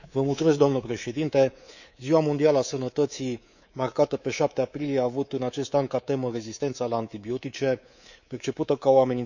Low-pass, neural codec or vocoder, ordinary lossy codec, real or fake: 7.2 kHz; codec, 24 kHz, 3.1 kbps, DualCodec; none; fake